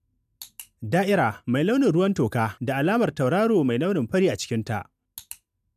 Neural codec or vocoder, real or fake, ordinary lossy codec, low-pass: none; real; none; 14.4 kHz